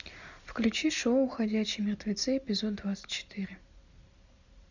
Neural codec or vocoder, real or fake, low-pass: none; real; 7.2 kHz